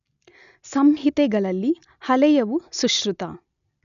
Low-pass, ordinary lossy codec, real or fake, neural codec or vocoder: 7.2 kHz; none; real; none